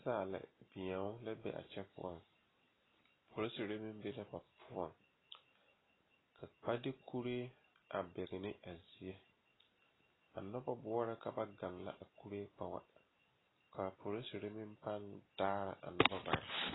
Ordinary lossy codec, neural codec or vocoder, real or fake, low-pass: AAC, 16 kbps; none; real; 7.2 kHz